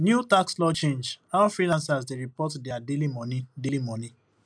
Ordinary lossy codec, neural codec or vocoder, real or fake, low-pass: none; none; real; 9.9 kHz